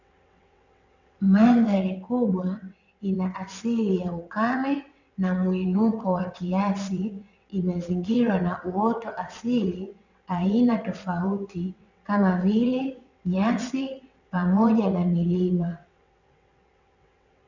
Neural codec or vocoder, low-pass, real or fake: vocoder, 22.05 kHz, 80 mel bands, WaveNeXt; 7.2 kHz; fake